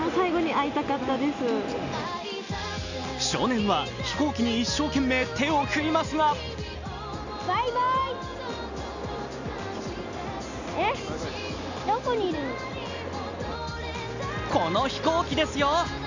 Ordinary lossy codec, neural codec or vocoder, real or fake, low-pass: none; none; real; 7.2 kHz